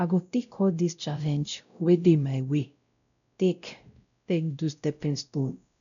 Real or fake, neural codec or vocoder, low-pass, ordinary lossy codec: fake; codec, 16 kHz, 0.5 kbps, X-Codec, WavLM features, trained on Multilingual LibriSpeech; 7.2 kHz; none